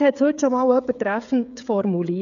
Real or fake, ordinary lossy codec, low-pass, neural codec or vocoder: fake; none; 7.2 kHz; codec, 16 kHz, 16 kbps, FreqCodec, smaller model